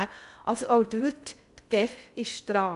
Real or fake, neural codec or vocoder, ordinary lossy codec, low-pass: fake; codec, 16 kHz in and 24 kHz out, 0.6 kbps, FocalCodec, streaming, 2048 codes; AAC, 96 kbps; 10.8 kHz